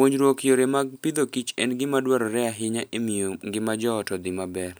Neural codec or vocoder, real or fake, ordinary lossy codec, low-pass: none; real; none; none